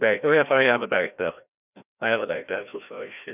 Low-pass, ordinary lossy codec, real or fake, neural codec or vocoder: 3.6 kHz; none; fake; codec, 16 kHz, 1 kbps, FreqCodec, larger model